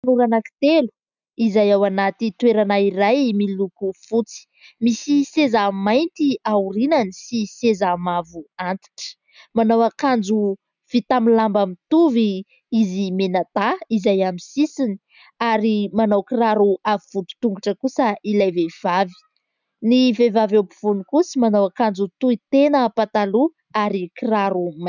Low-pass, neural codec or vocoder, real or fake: 7.2 kHz; none; real